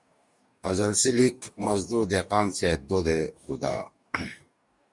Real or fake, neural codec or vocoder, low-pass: fake; codec, 44.1 kHz, 2.6 kbps, DAC; 10.8 kHz